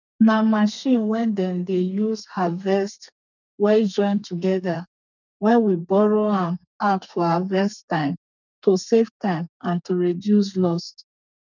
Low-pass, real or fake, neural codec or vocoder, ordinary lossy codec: 7.2 kHz; fake; codec, 32 kHz, 1.9 kbps, SNAC; none